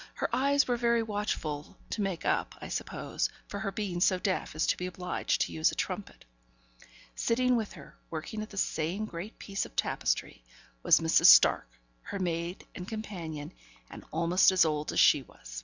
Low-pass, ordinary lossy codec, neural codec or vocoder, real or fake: 7.2 kHz; Opus, 64 kbps; none; real